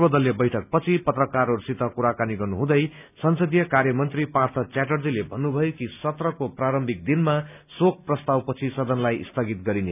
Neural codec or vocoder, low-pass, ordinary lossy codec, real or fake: none; 3.6 kHz; none; real